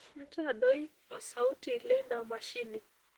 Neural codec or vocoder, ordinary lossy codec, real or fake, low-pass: autoencoder, 48 kHz, 32 numbers a frame, DAC-VAE, trained on Japanese speech; Opus, 16 kbps; fake; 19.8 kHz